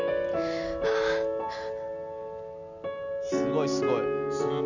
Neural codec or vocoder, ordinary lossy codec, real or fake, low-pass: none; none; real; 7.2 kHz